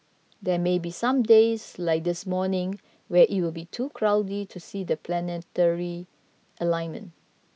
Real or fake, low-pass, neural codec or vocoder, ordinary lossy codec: real; none; none; none